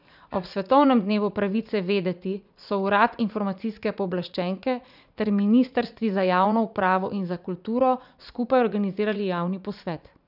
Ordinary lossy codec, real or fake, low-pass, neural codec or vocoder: none; fake; 5.4 kHz; vocoder, 44.1 kHz, 80 mel bands, Vocos